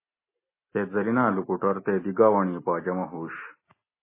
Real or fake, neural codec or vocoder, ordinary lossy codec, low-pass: real; none; MP3, 16 kbps; 3.6 kHz